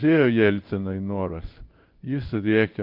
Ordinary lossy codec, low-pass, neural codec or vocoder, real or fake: Opus, 24 kbps; 5.4 kHz; codec, 16 kHz in and 24 kHz out, 1 kbps, XY-Tokenizer; fake